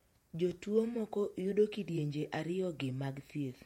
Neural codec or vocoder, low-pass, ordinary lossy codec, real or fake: vocoder, 48 kHz, 128 mel bands, Vocos; 19.8 kHz; MP3, 64 kbps; fake